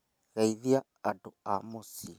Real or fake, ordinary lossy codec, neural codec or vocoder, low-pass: real; none; none; none